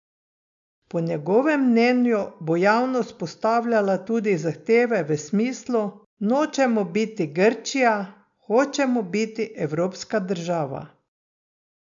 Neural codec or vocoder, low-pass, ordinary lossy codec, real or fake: none; 7.2 kHz; MP3, 64 kbps; real